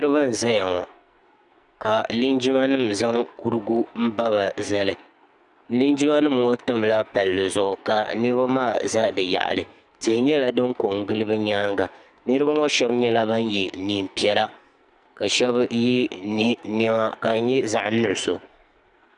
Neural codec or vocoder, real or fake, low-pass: codec, 44.1 kHz, 2.6 kbps, SNAC; fake; 10.8 kHz